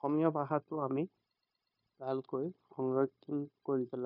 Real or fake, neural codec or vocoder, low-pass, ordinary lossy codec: fake; codec, 16 kHz, 0.9 kbps, LongCat-Audio-Codec; 5.4 kHz; none